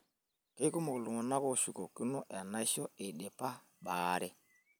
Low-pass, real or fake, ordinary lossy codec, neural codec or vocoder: none; real; none; none